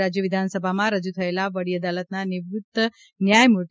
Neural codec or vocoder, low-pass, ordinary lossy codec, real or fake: none; none; none; real